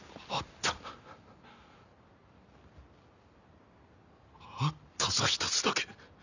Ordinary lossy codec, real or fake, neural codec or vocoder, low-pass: none; real; none; 7.2 kHz